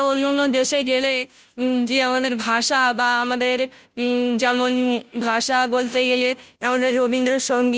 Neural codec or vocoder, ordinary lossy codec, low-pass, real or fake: codec, 16 kHz, 0.5 kbps, FunCodec, trained on Chinese and English, 25 frames a second; none; none; fake